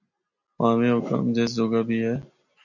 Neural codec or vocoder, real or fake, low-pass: none; real; 7.2 kHz